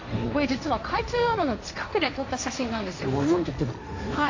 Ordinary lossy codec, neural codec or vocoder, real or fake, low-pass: AAC, 48 kbps; codec, 16 kHz, 1.1 kbps, Voila-Tokenizer; fake; 7.2 kHz